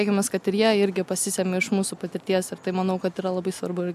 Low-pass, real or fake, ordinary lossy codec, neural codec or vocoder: 14.4 kHz; real; MP3, 96 kbps; none